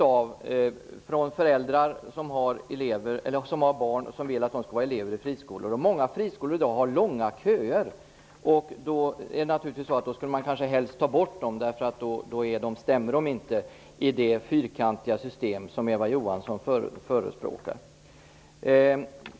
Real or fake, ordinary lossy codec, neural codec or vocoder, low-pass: real; none; none; none